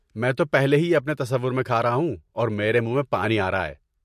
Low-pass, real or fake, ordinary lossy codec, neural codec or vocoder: 14.4 kHz; real; MP3, 64 kbps; none